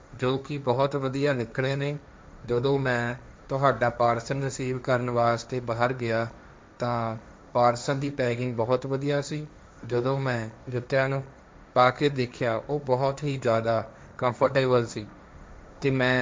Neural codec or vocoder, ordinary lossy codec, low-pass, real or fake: codec, 16 kHz, 1.1 kbps, Voila-Tokenizer; none; none; fake